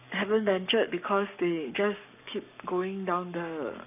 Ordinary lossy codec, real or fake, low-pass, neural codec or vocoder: none; fake; 3.6 kHz; codec, 44.1 kHz, 7.8 kbps, Pupu-Codec